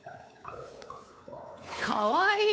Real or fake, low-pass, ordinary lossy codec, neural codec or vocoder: fake; none; none; codec, 16 kHz, 4 kbps, X-Codec, WavLM features, trained on Multilingual LibriSpeech